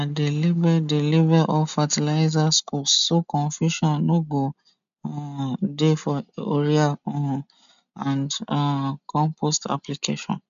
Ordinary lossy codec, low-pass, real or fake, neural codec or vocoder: none; 7.2 kHz; real; none